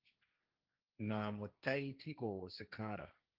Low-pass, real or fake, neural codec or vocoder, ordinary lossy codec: 5.4 kHz; fake; codec, 16 kHz, 1.1 kbps, Voila-Tokenizer; Opus, 32 kbps